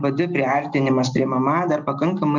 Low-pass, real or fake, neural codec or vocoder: 7.2 kHz; real; none